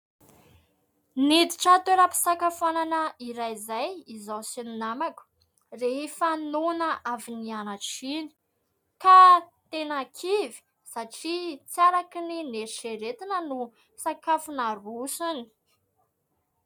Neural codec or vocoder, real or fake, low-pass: none; real; 19.8 kHz